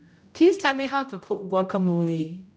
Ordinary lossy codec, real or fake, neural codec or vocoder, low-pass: none; fake; codec, 16 kHz, 0.5 kbps, X-Codec, HuBERT features, trained on general audio; none